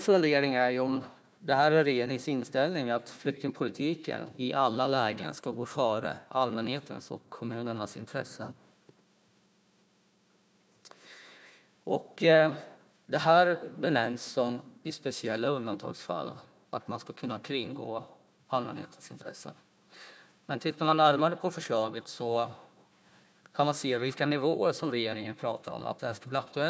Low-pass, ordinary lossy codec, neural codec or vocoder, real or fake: none; none; codec, 16 kHz, 1 kbps, FunCodec, trained on Chinese and English, 50 frames a second; fake